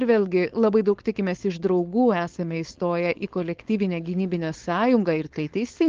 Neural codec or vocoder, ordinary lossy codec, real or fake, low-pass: codec, 16 kHz, 4.8 kbps, FACodec; Opus, 16 kbps; fake; 7.2 kHz